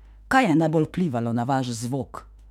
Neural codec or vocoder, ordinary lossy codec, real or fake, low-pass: autoencoder, 48 kHz, 32 numbers a frame, DAC-VAE, trained on Japanese speech; none; fake; 19.8 kHz